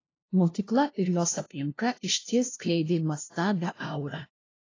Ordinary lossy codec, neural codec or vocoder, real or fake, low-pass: AAC, 32 kbps; codec, 16 kHz, 0.5 kbps, FunCodec, trained on LibriTTS, 25 frames a second; fake; 7.2 kHz